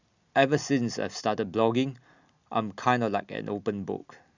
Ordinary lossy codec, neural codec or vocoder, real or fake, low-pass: Opus, 64 kbps; none; real; 7.2 kHz